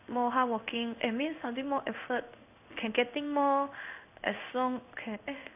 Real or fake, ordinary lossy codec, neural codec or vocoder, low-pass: fake; none; codec, 16 kHz in and 24 kHz out, 1 kbps, XY-Tokenizer; 3.6 kHz